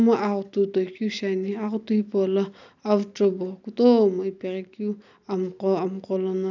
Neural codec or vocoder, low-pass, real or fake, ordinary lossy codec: none; 7.2 kHz; real; none